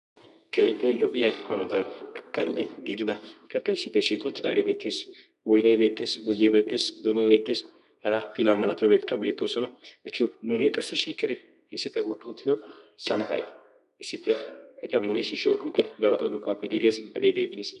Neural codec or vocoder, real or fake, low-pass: codec, 24 kHz, 0.9 kbps, WavTokenizer, medium music audio release; fake; 10.8 kHz